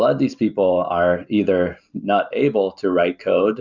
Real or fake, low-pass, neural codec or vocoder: real; 7.2 kHz; none